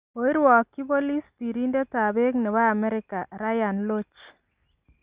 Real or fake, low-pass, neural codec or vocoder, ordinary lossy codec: real; 3.6 kHz; none; Opus, 64 kbps